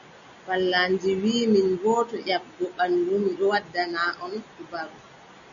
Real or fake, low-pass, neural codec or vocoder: real; 7.2 kHz; none